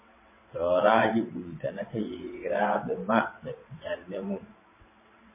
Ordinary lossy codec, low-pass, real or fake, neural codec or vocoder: MP3, 16 kbps; 3.6 kHz; fake; vocoder, 22.05 kHz, 80 mel bands, WaveNeXt